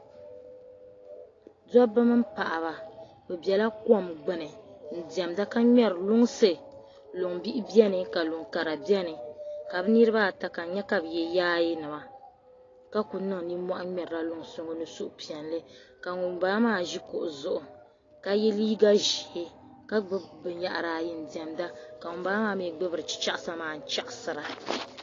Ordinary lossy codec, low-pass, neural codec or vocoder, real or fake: AAC, 32 kbps; 7.2 kHz; none; real